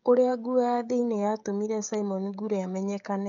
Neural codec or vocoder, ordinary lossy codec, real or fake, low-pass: codec, 16 kHz, 16 kbps, FreqCodec, smaller model; none; fake; 7.2 kHz